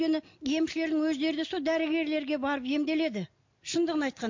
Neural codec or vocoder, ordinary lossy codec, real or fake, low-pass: none; MP3, 48 kbps; real; 7.2 kHz